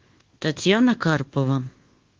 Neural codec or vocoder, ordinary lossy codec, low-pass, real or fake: codec, 24 kHz, 1.2 kbps, DualCodec; Opus, 16 kbps; 7.2 kHz; fake